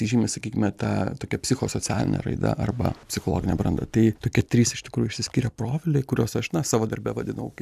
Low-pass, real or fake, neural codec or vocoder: 14.4 kHz; real; none